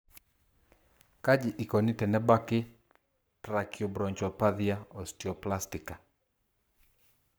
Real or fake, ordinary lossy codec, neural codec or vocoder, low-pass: fake; none; codec, 44.1 kHz, 7.8 kbps, Pupu-Codec; none